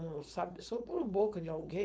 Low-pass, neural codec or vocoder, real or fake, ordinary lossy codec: none; codec, 16 kHz, 4.8 kbps, FACodec; fake; none